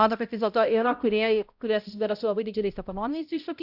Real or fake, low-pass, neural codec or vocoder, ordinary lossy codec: fake; 5.4 kHz; codec, 16 kHz, 0.5 kbps, X-Codec, HuBERT features, trained on balanced general audio; Opus, 64 kbps